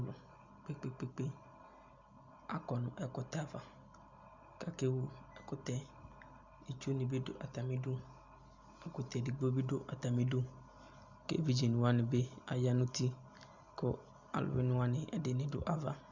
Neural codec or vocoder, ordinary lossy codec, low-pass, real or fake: none; Opus, 64 kbps; 7.2 kHz; real